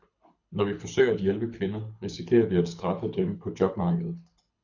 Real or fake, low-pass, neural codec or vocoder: fake; 7.2 kHz; codec, 24 kHz, 6 kbps, HILCodec